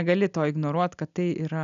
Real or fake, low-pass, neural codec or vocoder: real; 7.2 kHz; none